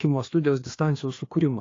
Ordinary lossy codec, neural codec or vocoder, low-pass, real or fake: AAC, 32 kbps; codec, 16 kHz, 2 kbps, FreqCodec, larger model; 7.2 kHz; fake